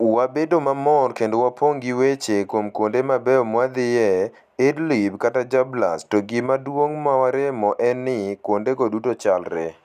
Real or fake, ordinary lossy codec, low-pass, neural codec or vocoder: real; none; 14.4 kHz; none